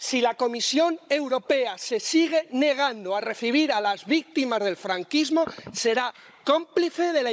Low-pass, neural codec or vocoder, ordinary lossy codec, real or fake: none; codec, 16 kHz, 16 kbps, FunCodec, trained on Chinese and English, 50 frames a second; none; fake